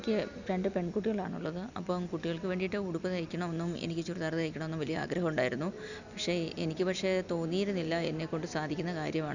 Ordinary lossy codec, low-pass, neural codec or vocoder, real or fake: none; 7.2 kHz; none; real